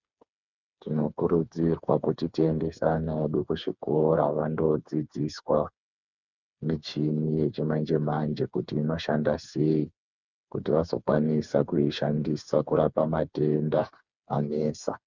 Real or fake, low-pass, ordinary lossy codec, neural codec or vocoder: fake; 7.2 kHz; Opus, 64 kbps; codec, 16 kHz, 4 kbps, FreqCodec, smaller model